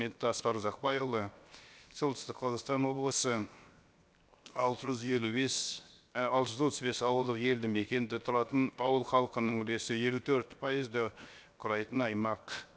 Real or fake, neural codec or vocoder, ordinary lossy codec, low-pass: fake; codec, 16 kHz, 0.7 kbps, FocalCodec; none; none